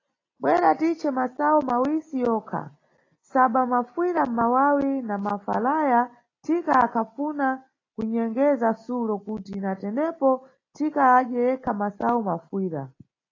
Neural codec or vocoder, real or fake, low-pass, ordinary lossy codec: none; real; 7.2 kHz; AAC, 32 kbps